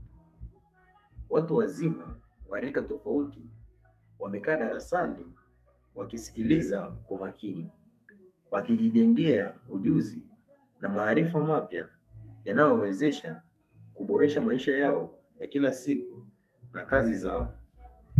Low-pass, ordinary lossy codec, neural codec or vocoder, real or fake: 14.4 kHz; MP3, 96 kbps; codec, 32 kHz, 1.9 kbps, SNAC; fake